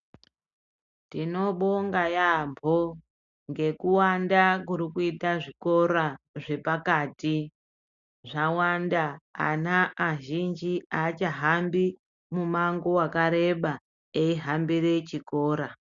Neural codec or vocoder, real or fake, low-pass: none; real; 7.2 kHz